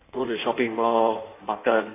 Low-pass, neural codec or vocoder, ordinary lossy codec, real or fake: 3.6 kHz; codec, 16 kHz in and 24 kHz out, 1.1 kbps, FireRedTTS-2 codec; AAC, 24 kbps; fake